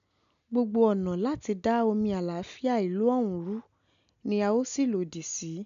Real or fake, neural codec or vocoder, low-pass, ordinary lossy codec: real; none; 7.2 kHz; AAC, 96 kbps